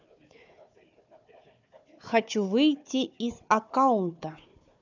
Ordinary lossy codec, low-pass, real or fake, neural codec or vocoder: none; 7.2 kHz; fake; codec, 16 kHz, 4 kbps, FunCodec, trained on Chinese and English, 50 frames a second